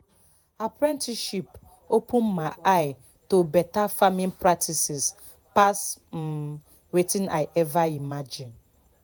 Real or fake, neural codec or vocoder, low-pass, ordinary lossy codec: fake; vocoder, 48 kHz, 128 mel bands, Vocos; none; none